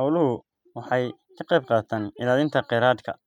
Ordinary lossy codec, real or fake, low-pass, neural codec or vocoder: none; real; 19.8 kHz; none